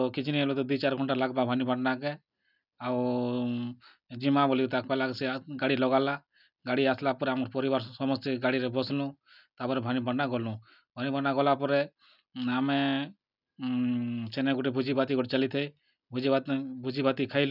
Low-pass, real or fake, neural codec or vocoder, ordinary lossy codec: 5.4 kHz; real; none; none